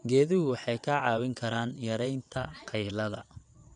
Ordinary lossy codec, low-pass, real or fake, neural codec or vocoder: none; 9.9 kHz; fake; vocoder, 22.05 kHz, 80 mel bands, WaveNeXt